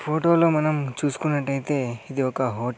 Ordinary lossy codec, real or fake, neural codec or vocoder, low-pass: none; real; none; none